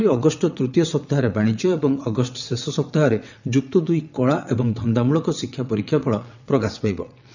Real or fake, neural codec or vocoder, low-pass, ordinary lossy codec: fake; vocoder, 22.05 kHz, 80 mel bands, WaveNeXt; 7.2 kHz; none